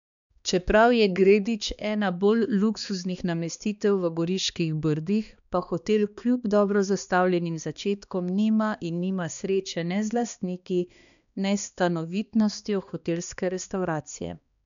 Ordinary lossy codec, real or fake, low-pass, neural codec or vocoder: none; fake; 7.2 kHz; codec, 16 kHz, 2 kbps, X-Codec, HuBERT features, trained on balanced general audio